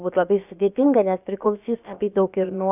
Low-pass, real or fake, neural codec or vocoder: 3.6 kHz; fake; codec, 16 kHz, about 1 kbps, DyCAST, with the encoder's durations